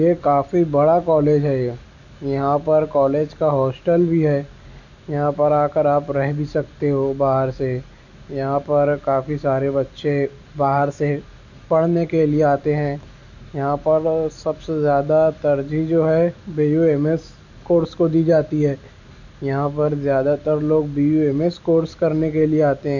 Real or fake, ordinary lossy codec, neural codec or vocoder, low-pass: real; none; none; 7.2 kHz